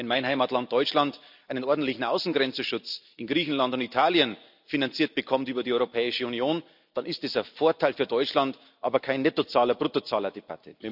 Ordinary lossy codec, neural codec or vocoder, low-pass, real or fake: none; none; 5.4 kHz; real